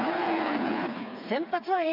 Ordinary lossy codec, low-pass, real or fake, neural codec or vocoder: MP3, 48 kbps; 5.4 kHz; fake; codec, 16 kHz, 4 kbps, FreqCodec, smaller model